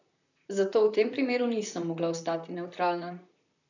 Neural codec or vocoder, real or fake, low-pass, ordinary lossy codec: vocoder, 44.1 kHz, 128 mel bands, Pupu-Vocoder; fake; 7.2 kHz; none